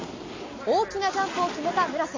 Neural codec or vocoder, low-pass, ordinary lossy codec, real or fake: none; 7.2 kHz; AAC, 32 kbps; real